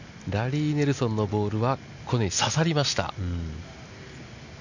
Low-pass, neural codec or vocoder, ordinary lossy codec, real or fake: 7.2 kHz; none; none; real